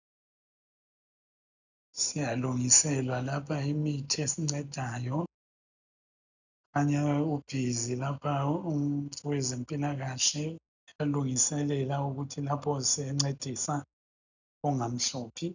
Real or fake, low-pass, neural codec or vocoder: real; 7.2 kHz; none